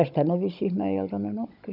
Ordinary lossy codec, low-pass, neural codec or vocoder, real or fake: none; 5.4 kHz; codec, 16 kHz, 16 kbps, FunCodec, trained on Chinese and English, 50 frames a second; fake